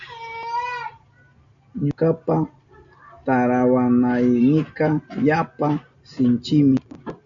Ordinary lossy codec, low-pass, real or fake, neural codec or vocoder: MP3, 96 kbps; 7.2 kHz; real; none